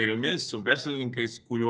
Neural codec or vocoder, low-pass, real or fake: codec, 32 kHz, 1.9 kbps, SNAC; 9.9 kHz; fake